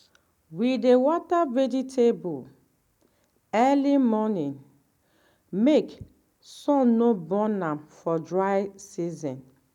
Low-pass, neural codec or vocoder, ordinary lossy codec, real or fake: 19.8 kHz; none; none; real